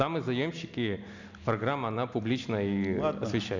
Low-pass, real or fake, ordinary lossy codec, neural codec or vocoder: 7.2 kHz; real; none; none